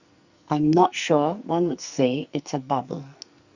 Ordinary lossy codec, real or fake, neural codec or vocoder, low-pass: Opus, 64 kbps; fake; codec, 44.1 kHz, 2.6 kbps, SNAC; 7.2 kHz